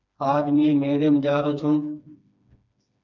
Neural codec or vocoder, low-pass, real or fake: codec, 16 kHz, 2 kbps, FreqCodec, smaller model; 7.2 kHz; fake